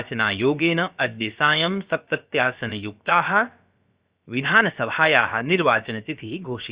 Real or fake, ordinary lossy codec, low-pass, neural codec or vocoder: fake; Opus, 64 kbps; 3.6 kHz; codec, 16 kHz, about 1 kbps, DyCAST, with the encoder's durations